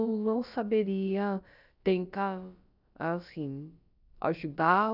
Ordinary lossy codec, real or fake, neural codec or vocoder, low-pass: none; fake; codec, 16 kHz, about 1 kbps, DyCAST, with the encoder's durations; 5.4 kHz